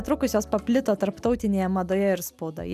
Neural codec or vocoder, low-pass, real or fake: none; 14.4 kHz; real